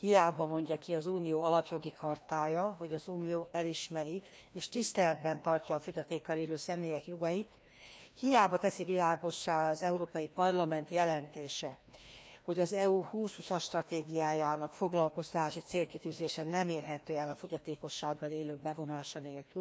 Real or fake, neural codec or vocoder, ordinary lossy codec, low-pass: fake; codec, 16 kHz, 1 kbps, FreqCodec, larger model; none; none